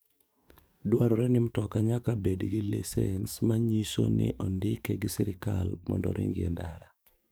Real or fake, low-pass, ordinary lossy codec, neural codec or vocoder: fake; none; none; codec, 44.1 kHz, 7.8 kbps, DAC